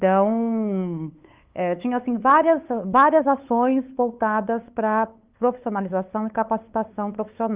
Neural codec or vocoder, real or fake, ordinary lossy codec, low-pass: codec, 16 kHz, 8 kbps, FunCodec, trained on LibriTTS, 25 frames a second; fake; Opus, 32 kbps; 3.6 kHz